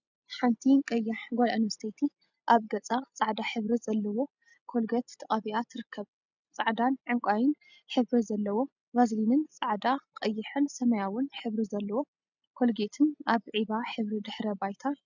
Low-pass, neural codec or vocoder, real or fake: 7.2 kHz; none; real